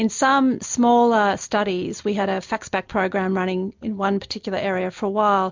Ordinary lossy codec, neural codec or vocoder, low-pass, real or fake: MP3, 64 kbps; none; 7.2 kHz; real